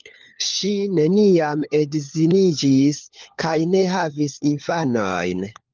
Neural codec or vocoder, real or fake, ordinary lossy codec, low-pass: codec, 16 kHz, 4 kbps, FunCodec, trained on LibriTTS, 50 frames a second; fake; Opus, 24 kbps; 7.2 kHz